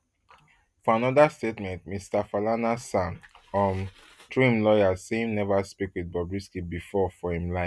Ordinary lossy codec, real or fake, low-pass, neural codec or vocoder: none; real; none; none